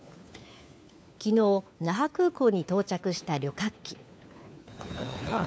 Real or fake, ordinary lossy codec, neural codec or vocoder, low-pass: fake; none; codec, 16 kHz, 4 kbps, FunCodec, trained on LibriTTS, 50 frames a second; none